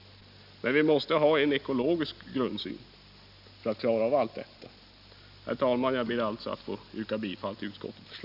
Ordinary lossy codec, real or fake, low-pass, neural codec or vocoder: none; real; 5.4 kHz; none